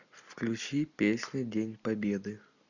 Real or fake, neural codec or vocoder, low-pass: real; none; 7.2 kHz